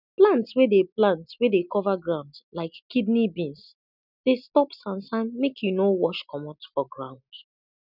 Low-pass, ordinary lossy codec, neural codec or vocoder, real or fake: 5.4 kHz; none; none; real